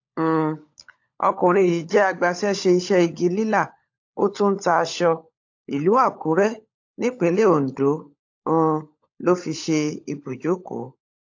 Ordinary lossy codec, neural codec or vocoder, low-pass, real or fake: AAC, 48 kbps; codec, 16 kHz, 16 kbps, FunCodec, trained on LibriTTS, 50 frames a second; 7.2 kHz; fake